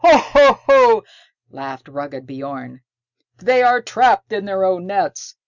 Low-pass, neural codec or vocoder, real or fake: 7.2 kHz; none; real